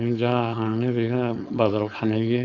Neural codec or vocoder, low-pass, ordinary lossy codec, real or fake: codec, 16 kHz, 4.8 kbps, FACodec; 7.2 kHz; none; fake